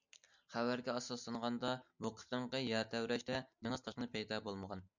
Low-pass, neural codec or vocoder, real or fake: 7.2 kHz; none; real